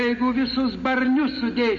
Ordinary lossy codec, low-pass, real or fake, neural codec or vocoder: MP3, 32 kbps; 7.2 kHz; real; none